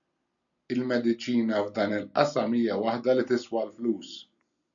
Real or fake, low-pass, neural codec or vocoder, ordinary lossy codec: real; 7.2 kHz; none; MP3, 96 kbps